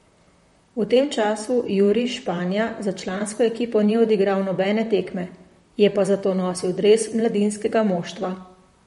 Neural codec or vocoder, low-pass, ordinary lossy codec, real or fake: vocoder, 44.1 kHz, 128 mel bands, Pupu-Vocoder; 19.8 kHz; MP3, 48 kbps; fake